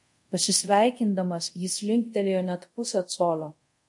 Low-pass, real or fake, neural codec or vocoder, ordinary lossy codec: 10.8 kHz; fake; codec, 24 kHz, 0.5 kbps, DualCodec; MP3, 48 kbps